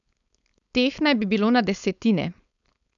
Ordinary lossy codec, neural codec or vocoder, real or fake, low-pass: none; codec, 16 kHz, 4.8 kbps, FACodec; fake; 7.2 kHz